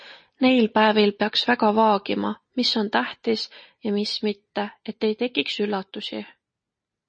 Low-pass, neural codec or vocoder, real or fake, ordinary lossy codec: 9.9 kHz; none; real; MP3, 32 kbps